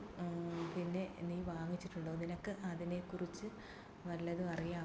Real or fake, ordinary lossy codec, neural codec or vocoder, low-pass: real; none; none; none